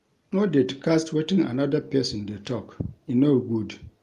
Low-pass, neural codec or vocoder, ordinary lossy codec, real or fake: 14.4 kHz; none; Opus, 32 kbps; real